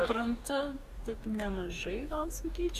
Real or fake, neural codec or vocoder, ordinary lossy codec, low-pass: fake; codec, 44.1 kHz, 2.6 kbps, DAC; Opus, 64 kbps; 14.4 kHz